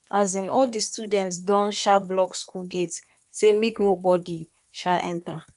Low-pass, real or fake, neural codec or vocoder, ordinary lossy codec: 10.8 kHz; fake; codec, 24 kHz, 1 kbps, SNAC; none